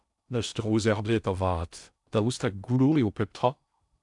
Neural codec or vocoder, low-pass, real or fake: codec, 16 kHz in and 24 kHz out, 0.6 kbps, FocalCodec, streaming, 2048 codes; 10.8 kHz; fake